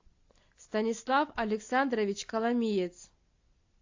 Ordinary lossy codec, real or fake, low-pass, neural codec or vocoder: AAC, 48 kbps; real; 7.2 kHz; none